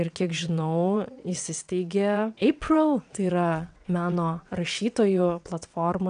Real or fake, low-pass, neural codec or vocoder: fake; 9.9 kHz; vocoder, 22.05 kHz, 80 mel bands, WaveNeXt